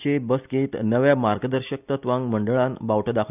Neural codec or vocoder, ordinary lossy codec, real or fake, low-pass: none; none; real; 3.6 kHz